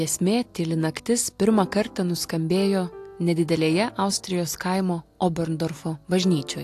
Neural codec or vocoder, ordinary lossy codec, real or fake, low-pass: none; AAC, 64 kbps; real; 14.4 kHz